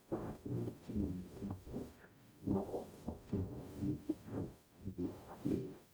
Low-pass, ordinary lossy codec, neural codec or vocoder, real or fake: none; none; codec, 44.1 kHz, 0.9 kbps, DAC; fake